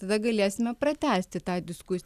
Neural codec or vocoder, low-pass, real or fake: none; 14.4 kHz; real